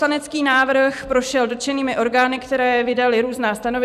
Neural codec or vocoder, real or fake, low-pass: vocoder, 44.1 kHz, 128 mel bands every 256 samples, BigVGAN v2; fake; 14.4 kHz